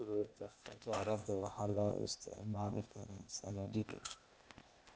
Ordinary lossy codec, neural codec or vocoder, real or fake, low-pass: none; codec, 16 kHz, 0.8 kbps, ZipCodec; fake; none